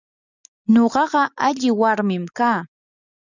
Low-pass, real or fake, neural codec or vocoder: 7.2 kHz; real; none